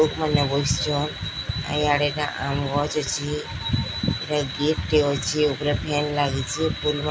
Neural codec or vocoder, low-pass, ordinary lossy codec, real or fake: none; none; none; real